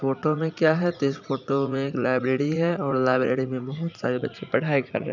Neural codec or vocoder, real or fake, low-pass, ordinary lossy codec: vocoder, 44.1 kHz, 80 mel bands, Vocos; fake; 7.2 kHz; none